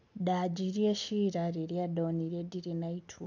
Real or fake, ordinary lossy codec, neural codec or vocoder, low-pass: real; none; none; 7.2 kHz